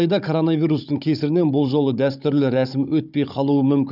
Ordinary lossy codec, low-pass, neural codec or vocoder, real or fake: none; 5.4 kHz; codec, 16 kHz, 16 kbps, FunCodec, trained on Chinese and English, 50 frames a second; fake